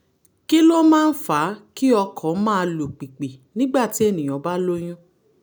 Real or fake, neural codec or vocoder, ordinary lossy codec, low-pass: real; none; none; none